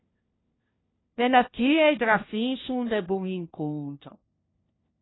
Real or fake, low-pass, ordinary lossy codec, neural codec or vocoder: fake; 7.2 kHz; AAC, 16 kbps; codec, 16 kHz, 1 kbps, FunCodec, trained on LibriTTS, 50 frames a second